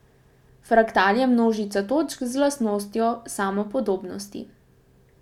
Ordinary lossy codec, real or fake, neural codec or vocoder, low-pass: none; real; none; 19.8 kHz